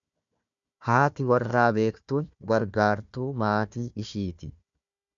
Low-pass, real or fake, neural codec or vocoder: 7.2 kHz; fake; codec, 16 kHz, 1 kbps, FunCodec, trained on Chinese and English, 50 frames a second